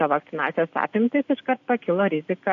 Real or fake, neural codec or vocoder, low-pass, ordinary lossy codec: real; none; 7.2 kHz; AAC, 48 kbps